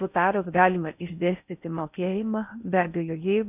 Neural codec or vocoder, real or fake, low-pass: codec, 16 kHz in and 24 kHz out, 0.6 kbps, FocalCodec, streaming, 4096 codes; fake; 3.6 kHz